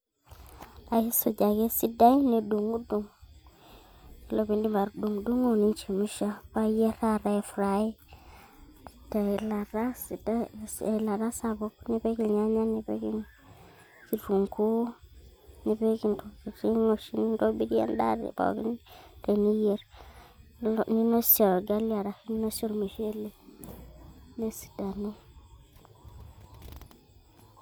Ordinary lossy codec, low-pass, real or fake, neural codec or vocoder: none; none; real; none